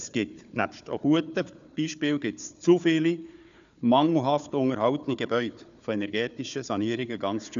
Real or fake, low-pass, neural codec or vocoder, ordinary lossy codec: fake; 7.2 kHz; codec, 16 kHz, 4 kbps, FunCodec, trained on Chinese and English, 50 frames a second; none